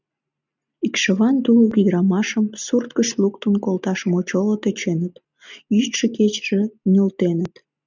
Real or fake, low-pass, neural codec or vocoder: real; 7.2 kHz; none